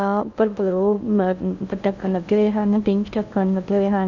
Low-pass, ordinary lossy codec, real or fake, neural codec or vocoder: 7.2 kHz; none; fake; codec, 16 kHz in and 24 kHz out, 0.6 kbps, FocalCodec, streaming, 2048 codes